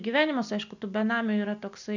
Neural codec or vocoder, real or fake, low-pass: none; real; 7.2 kHz